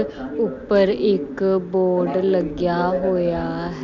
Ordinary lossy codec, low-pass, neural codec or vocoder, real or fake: MP3, 64 kbps; 7.2 kHz; none; real